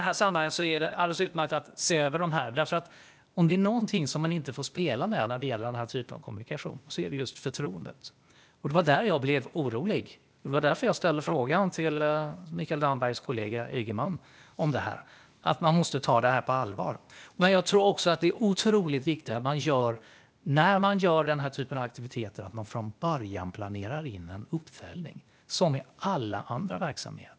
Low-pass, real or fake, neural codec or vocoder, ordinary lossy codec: none; fake; codec, 16 kHz, 0.8 kbps, ZipCodec; none